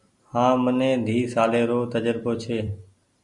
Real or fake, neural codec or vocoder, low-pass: real; none; 10.8 kHz